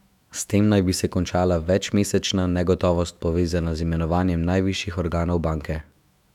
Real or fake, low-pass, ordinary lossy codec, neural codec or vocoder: fake; 19.8 kHz; none; autoencoder, 48 kHz, 128 numbers a frame, DAC-VAE, trained on Japanese speech